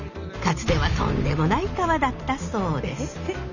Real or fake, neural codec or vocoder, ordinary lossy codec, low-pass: real; none; none; 7.2 kHz